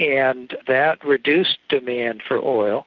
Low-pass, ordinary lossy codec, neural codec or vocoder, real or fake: 7.2 kHz; Opus, 32 kbps; none; real